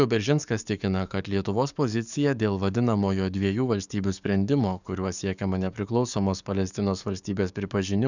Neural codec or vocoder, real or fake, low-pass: codec, 44.1 kHz, 7.8 kbps, DAC; fake; 7.2 kHz